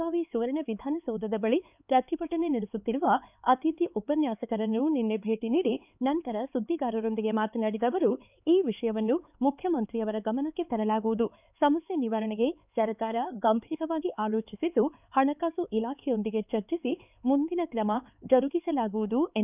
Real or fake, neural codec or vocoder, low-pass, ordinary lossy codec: fake; codec, 16 kHz, 4 kbps, X-Codec, HuBERT features, trained on LibriSpeech; 3.6 kHz; none